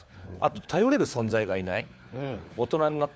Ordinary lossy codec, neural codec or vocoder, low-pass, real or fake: none; codec, 16 kHz, 4 kbps, FunCodec, trained on LibriTTS, 50 frames a second; none; fake